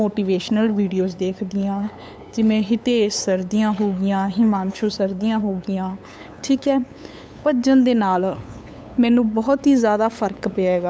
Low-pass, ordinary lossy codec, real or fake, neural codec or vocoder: none; none; fake; codec, 16 kHz, 8 kbps, FunCodec, trained on LibriTTS, 25 frames a second